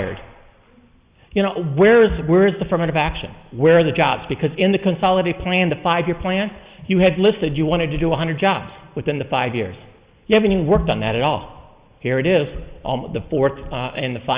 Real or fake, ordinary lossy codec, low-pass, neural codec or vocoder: real; Opus, 64 kbps; 3.6 kHz; none